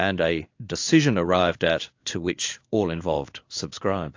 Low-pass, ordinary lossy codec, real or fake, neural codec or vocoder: 7.2 kHz; AAC, 48 kbps; fake; codec, 16 kHz in and 24 kHz out, 1 kbps, XY-Tokenizer